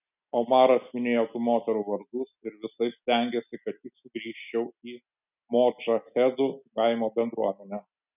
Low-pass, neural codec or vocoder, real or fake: 3.6 kHz; none; real